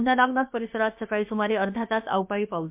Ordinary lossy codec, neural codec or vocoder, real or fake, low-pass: MP3, 32 kbps; codec, 16 kHz, about 1 kbps, DyCAST, with the encoder's durations; fake; 3.6 kHz